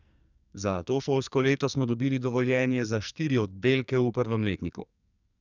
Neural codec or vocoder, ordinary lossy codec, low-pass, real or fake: codec, 44.1 kHz, 2.6 kbps, SNAC; none; 7.2 kHz; fake